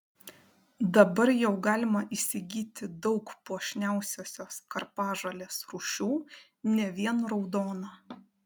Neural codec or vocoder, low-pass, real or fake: none; 19.8 kHz; real